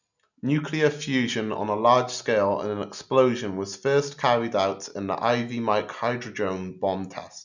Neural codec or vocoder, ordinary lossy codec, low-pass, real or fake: none; none; 7.2 kHz; real